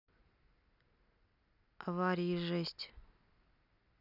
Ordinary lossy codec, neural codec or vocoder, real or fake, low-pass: none; none; real; 5.4 kHz